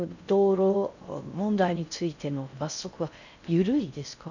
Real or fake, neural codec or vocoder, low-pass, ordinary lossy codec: fake; codec, 16 kHz in and 24 kHz out, 0.6 kbps, FocalCodec, streaming, 4096 codes; 7.2 kHz; none